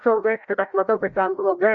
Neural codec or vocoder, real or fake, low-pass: codec, 16 kHz, 0.5 kbps, FreqCodec, larger model; fake; 7.2 kHz